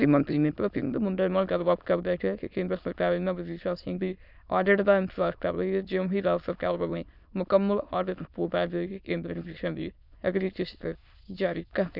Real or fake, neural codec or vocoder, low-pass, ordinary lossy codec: fake; autoencoder, 22.05 kHz, a latent of 192 numbers a frame, VITS, trained on many speakers; 5.4 kHz; none